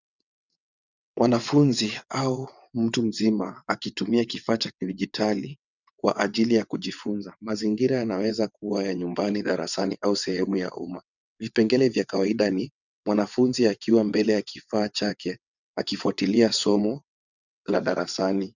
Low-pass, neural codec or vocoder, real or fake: 7.2 kHz; vocoder, 22.05 kHz, 80 mel bands, WaveNeXt; fake